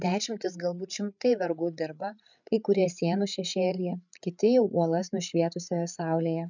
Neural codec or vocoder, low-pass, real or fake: codec, 16 kHz, 8 kbps, FreqCodec, larger model; 7.2 kHz; fake